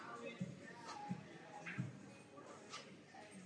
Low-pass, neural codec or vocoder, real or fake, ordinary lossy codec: 9.9 kHz; none; real; MP3, 64 kbps